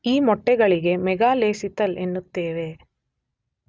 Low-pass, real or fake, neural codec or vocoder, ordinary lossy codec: none; real; none; none